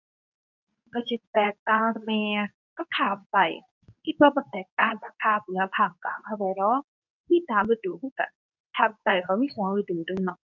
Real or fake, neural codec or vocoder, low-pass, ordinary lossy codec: fake; codec, 24 kHz, 0.9 kbps, WavTokenizer, medium speech release version 2; 7.2 kHz; none